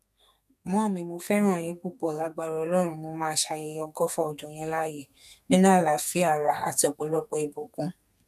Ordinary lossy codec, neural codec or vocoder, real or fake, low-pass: none; codec, 32 kHz, 1.9 kbps, SNAC; fake; 14.4 kHz